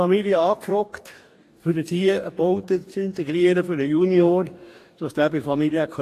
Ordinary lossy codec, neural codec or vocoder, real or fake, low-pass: AAC, 64 kbps; codec, 44.1 kHz, 2.6 kbps, DAC; fake; 14.4 kHz